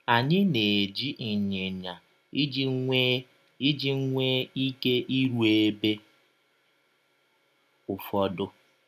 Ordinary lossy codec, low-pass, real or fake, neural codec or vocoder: none; 14.4 kHz; real; none